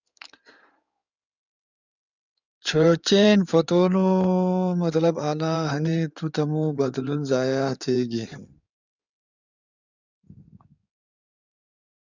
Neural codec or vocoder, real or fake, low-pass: codec, 16 kHz in and 24 kHz out, 2.2 kbps, FireRedTTS-2 codec; fake; 7.2 kHz